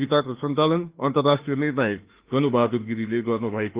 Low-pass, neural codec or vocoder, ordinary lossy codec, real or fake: 3.6 kHz; autoencoder, 48 kHz, 32 numbers a frame, DAC-VAE, trained on Japanese speech; Opus, 16 kbps; fake